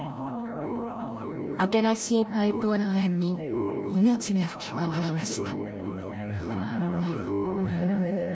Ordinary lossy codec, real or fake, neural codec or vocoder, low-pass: none; fake; codec, 16 kHz, 0.5 kbps, FreqCodec, larger model; none